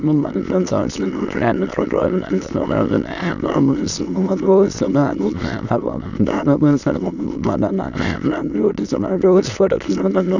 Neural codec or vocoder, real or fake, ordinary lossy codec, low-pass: autoencoder, 22.05 kHz, a latent of 192 numbers a frame, VITS, trained on many speakers; fake; none; 7.2 kHz